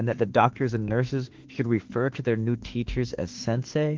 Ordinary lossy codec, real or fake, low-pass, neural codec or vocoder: Opus, 16 kbps; fake; 7.2 kHz; autoencoder, 48 kHz, 32 numbers a frame, DAC-VAE, trained on Japanese speech